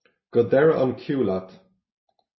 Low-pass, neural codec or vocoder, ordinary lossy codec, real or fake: 7.2 kHz; none; MP3, 24 kbps; real